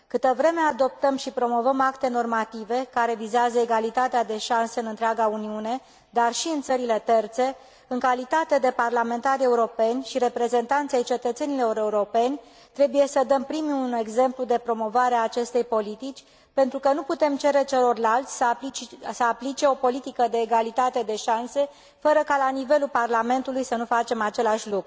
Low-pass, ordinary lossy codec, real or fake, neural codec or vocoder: none; none; real; none